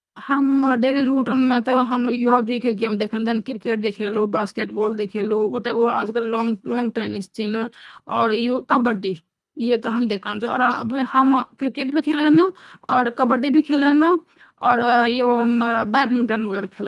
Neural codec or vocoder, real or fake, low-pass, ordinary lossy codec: codec, 24 kHz, 1.5 kbps, HILCodec; fake; none; none